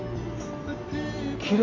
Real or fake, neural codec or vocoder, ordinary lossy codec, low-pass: real; none; MP3, 48 kbps; 7.2 kHz